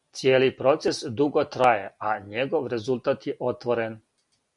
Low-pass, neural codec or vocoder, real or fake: 10.8 kHz; none; real